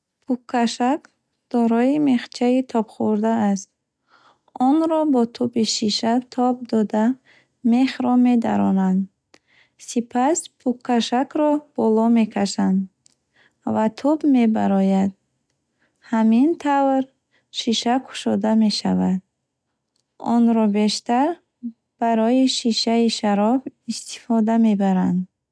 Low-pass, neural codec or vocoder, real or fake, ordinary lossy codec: none; none; real; none